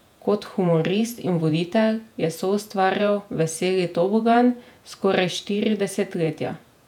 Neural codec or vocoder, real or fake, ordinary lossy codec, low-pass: vocoder, 48 kHz, 128 mel bands, Vocos; fake; none; 19.8 kHz